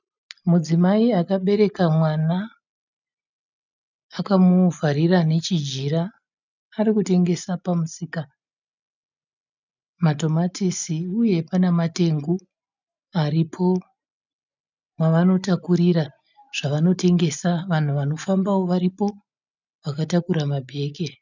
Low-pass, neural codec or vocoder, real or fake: 7.2 kHz; none; real